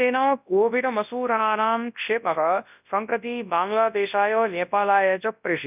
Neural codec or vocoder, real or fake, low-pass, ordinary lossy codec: codec, 24 kHz, 0.9 kbps, WavTokenizer, large speech release; fake; 3.6 kHz; none